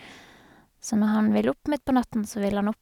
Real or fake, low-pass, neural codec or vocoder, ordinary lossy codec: real; 19.8 kHz; none; none